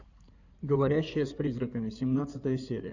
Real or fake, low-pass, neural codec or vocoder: fake; 7.2 kHz; codec, 16 kHz in and 24 kHz out, 2.2 kbps, FireRedTTS-2 codec